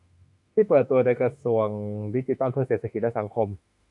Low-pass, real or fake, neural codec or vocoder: 10.8 kHz; fake; autoencoder, 48 kHz, 32 numbers a frame, DAC-VAE, trained on Japanese speech